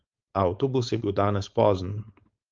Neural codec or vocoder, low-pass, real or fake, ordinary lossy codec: codec, 16 kHz, 4.8 kbps, FACodec; 7.2 kHz; fake; Opus, 24 kbps